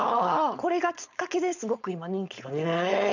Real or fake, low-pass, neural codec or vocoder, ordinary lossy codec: fake; 7.2 kHz; codec, 16 kHz, 4.8 kbps, FACodec; none